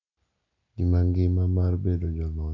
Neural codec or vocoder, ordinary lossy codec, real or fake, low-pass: none; none; real; 7.2 kHz